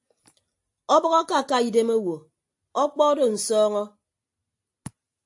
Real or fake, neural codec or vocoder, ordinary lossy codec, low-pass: real; none; AAC, 64 kbps; 10.8 kHz